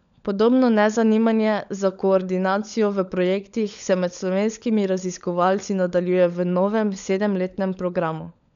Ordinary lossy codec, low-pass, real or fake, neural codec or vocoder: none; 7.2 kHz; fake; codec, 16 kHz, 16 kbps, FunCodec, trained on LibriTTS, 50 frames a second